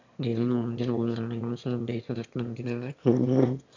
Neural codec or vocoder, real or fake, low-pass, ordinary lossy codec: autoencoder, 22.05 kHz, a latent of 192 numbers a frame, VITS, trained on one speaker; fake; 7.2 kHz; AAC, 48 kbps